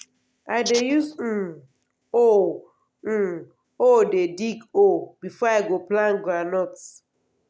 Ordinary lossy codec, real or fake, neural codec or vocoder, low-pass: none; real; none; none